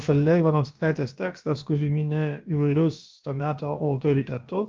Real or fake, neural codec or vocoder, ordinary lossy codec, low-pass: fake; codec, 16 kHz, about 1 kbps, DyCAST, with the encoder's durations; Opus, 24 kbps; 7.2 kHz